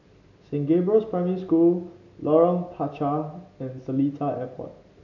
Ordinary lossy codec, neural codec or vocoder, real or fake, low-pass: none; none; real; 7.2 kHz